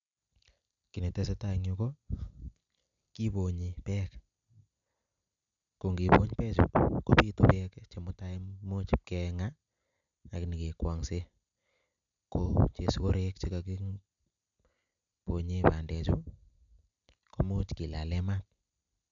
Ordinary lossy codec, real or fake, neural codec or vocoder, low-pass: none; real; none; 7.2 kHz